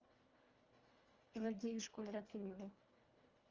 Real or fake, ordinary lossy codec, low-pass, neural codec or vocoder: fake; Opus, 32 kbps; 7.2 kHz; codec, 24 kHz, 1.5 kbps, HILCodec